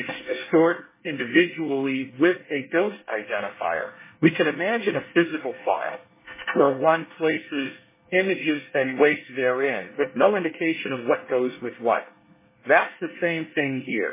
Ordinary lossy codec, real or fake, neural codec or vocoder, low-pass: MP3, 16 kbps; fake; codec, 24 kHz, 1 kbps, SNAC; 3.6 kHz